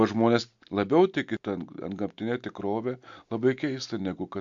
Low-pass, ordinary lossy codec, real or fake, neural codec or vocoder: 7.2 kHz; MP3, 64 kbps; real; none